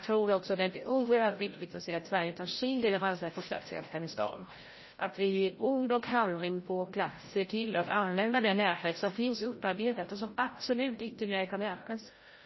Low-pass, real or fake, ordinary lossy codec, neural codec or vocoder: 7.2 kHz; fake; MP3, 24 kbps; codec, 16 kHz, 0.5 kbps, FreqCodec, larger model